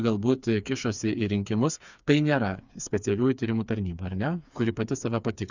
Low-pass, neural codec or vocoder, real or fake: 7.2 kHz; codec, 16 kHz, 4 kbps, FreqCodec, smaller model; fake